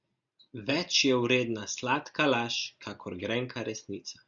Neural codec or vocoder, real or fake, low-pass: none; real; 7.2 kHz